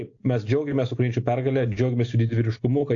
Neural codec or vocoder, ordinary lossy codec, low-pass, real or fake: none; AAC, 48 kbps; 7.2 kHz; real